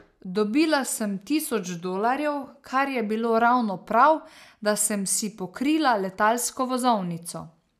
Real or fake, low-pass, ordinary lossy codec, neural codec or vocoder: real; 14.4 kHz; none; none